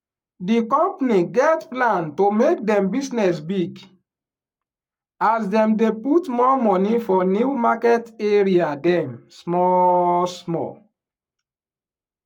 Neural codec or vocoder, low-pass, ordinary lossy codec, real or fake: codec, 44.1 kHz, 7.8 kbps, Pupu-Codec; 19.8 kHz; none; fake